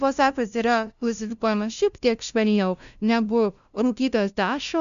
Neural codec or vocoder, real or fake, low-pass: codec, 16 kHz, 0.5 kbps, FunCodec, trained on LibriTTS, 25 frames a second; fake; 7.2 kHz